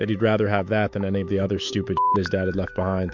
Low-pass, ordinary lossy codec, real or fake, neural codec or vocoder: 7.2 kHz; MP3, 64 kbps; fake; autoencoder, 48 kHz, 128 numbers a frame, DAC-VAE, trained on Japanese speech